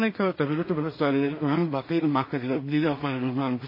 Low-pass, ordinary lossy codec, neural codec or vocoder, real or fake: 5.4 kHz; MP3, 24 kbps; codec, 16 kHz in and 24 kHz out, 0.4 kbps, LongCat-Audio-Codec, two codebook decoder; fake